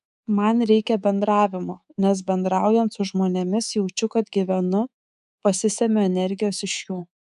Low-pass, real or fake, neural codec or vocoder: 10.8 kHz; fake; codec, 24 kHz, 3.1 kbps, DualCodec